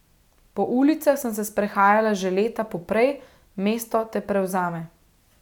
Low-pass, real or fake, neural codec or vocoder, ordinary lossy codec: 19.8 kHz; real; none; none